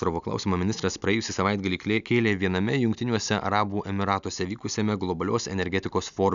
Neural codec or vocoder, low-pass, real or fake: none; 7.2 kHz; real